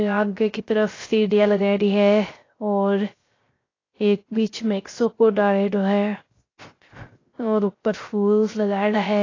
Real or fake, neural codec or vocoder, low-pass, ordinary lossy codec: fake; codec, 16 kHz, 0.3 kbps, FocalCodec; 7.2 kHz; AAC, 32 kbps